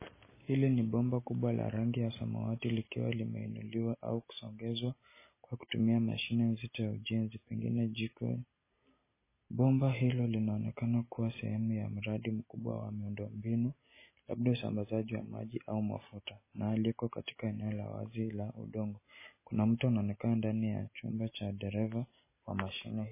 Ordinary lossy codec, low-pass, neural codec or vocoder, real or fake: MP3, 16 kbps; 3.6 kHz; none; real